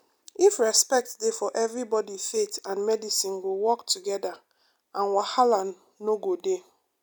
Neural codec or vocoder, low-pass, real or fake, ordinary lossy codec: none; none; real; none